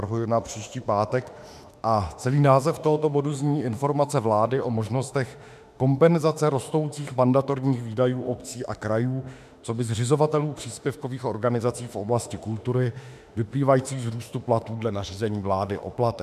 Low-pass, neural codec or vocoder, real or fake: 14.4 kHz; autoencoder, 48 kHz, 32 numbers a frame, DAC-VAE, trained on Japanese speech; fake